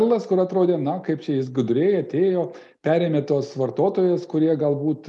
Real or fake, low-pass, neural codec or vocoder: real; 10.8 kHz; none